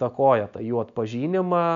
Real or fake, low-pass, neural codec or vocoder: real; 7.2 kHz; none